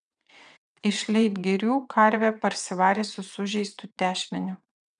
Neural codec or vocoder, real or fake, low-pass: vocoder, 22.05 kHz, 80 mel bands, WaveNeXt; fake; 9.9 kHz